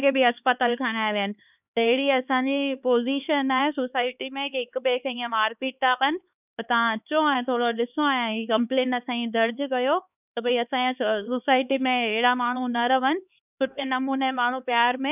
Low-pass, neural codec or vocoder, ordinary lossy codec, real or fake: 3.6 kHz; codec, 16 kHz, 4 kbps, X-Codec, HuBERT features, trained on LibriSpeech; none; fake